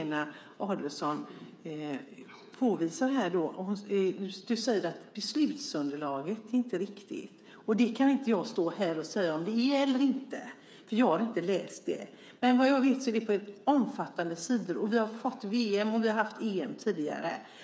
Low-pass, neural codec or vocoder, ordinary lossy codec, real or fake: none; codec, 16 kHz, 8 kbps, FreqCodec, smaller model; none; fake